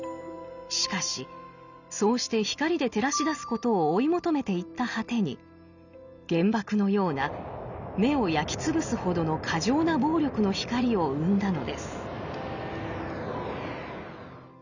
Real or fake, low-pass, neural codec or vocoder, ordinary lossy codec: real; 7.2 kHz; none; none